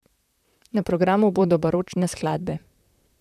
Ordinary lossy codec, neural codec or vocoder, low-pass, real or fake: none; vocoder, 44.1 kHz, 128 mel bands, Pupu-Vocoder; 14.4 kHz; fake